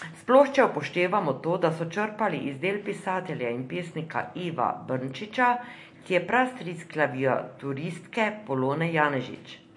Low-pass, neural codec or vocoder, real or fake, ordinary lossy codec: 10.8 kHz; none; real; MP3, 48 kbps